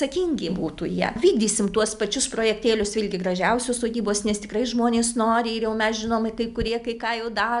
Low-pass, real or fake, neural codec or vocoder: 10.8 kHz; real; none